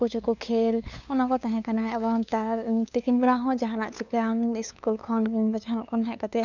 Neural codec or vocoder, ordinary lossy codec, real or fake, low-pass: codec, 16 kHz, 2 kbps, FunCodec, trained on LibriTTS, 25 frames a second; none; fake; 7.2 kHz